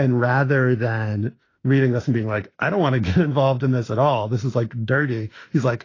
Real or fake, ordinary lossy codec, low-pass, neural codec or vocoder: fake; AAC, 32 kbps; 7.2 kHz; autoencoder, 48 kHz, 32 numbers a frame, DAC-VAE, trained on Japanese speech